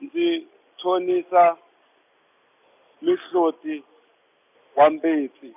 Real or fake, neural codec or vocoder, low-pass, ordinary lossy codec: real; none; 3.6 kHz; none